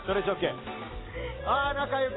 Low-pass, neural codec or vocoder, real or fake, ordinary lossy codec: 7.2 kHz; none; real; AAC, 16 kbps